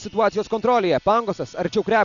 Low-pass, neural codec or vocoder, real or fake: 7.2 kHz; none; real